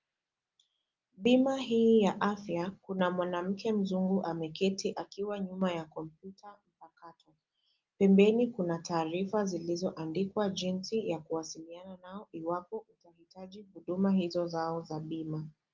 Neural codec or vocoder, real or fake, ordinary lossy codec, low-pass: none; real; Opus, 24 kbps; 7.2 kHz